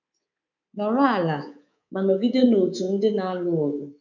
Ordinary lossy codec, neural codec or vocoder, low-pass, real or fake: none; codec, 24 kHz, 3.1 kbps, DualCodec; 7.2 kHz; fake